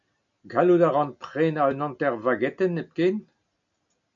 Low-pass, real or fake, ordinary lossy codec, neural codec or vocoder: 7.2 kHz; real; MP3, 96 kbps; none